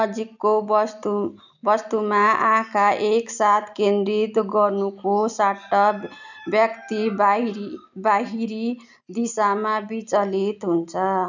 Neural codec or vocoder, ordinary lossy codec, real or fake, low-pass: none; none; real; 7.2 kHz